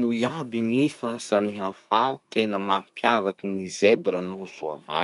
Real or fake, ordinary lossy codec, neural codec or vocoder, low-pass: fake; none; codec, 24 kHz, 1 kbps, SNAC; 10.8 kHz